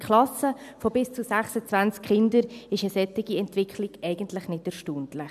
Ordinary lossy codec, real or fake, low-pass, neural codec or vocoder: none; real; 14.4 kHz; none